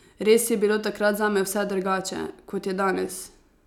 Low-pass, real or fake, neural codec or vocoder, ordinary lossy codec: 19.8 kHz; real; none; none